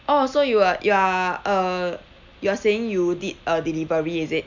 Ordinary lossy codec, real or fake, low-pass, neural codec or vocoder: none; real; 7.2 kHz; none